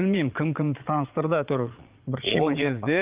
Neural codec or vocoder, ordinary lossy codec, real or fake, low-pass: vocoder, 22.05 kHz, 80 mel bands, Vocos; Opus, 32 kbps; fake; 3.6 kHz